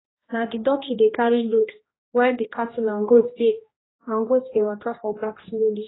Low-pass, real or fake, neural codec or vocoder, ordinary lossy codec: 7.2 kHz; fake; codec, 16 kHz, 1 kbps, X-Codec, HuBERT features, trained on general audio; AAC, 16 kbps